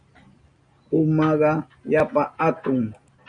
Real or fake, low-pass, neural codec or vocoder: real; 9.9 kHz; none